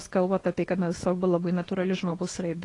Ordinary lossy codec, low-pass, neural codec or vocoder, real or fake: AAC, 32 kbps; 10.8 kHz; codec, 24 kHz, 0.9 kbps, WavTokenizer, small release; fake